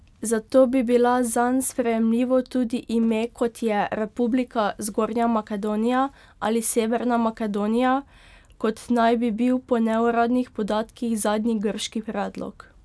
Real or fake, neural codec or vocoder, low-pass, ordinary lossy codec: real; none; none; none